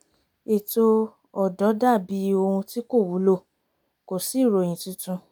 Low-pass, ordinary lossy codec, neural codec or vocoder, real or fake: none; none; none; real